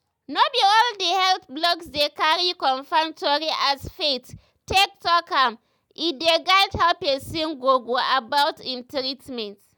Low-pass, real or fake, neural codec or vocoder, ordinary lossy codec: none; real; none; none